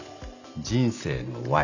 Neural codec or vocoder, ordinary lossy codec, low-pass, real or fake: none; none; 7.2 kHz; real